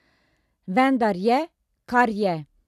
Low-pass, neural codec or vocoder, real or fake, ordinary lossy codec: 14.4 kHz; none; real; none